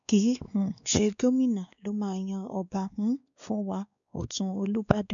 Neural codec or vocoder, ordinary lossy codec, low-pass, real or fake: codec, 16 kHz, 2 kbps, X-Codec, WavLM features, trained on Multilingual LibriSpeech; none; 7.2 kHz; fake